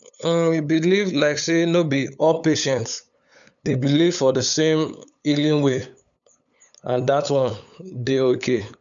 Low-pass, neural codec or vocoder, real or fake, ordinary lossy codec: 7.2 kHz; codec, 16 kHz, 8 kbps, FunCodec, trained on LibriTTS, 25 frames a second; fake; none